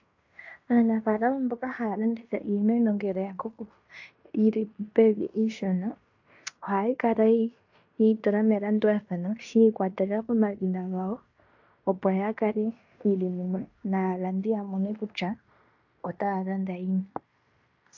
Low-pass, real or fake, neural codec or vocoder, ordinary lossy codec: 7.2 kHz; fake; codec, 16 kHz in and 24 kHz out, 0.9 kbps, LongCat-Audio-Codec, fine tuned four codebook decoder; AAC, 48 kbps